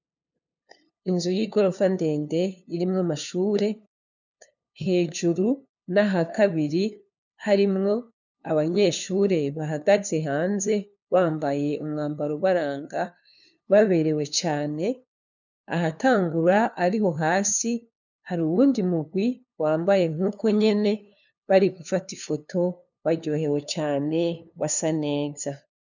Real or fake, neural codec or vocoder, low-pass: fake; codec, 16 kHz, 2 kbps, FunCodec, trained on LibriTTS, 25 frames a second; 7.2 kHz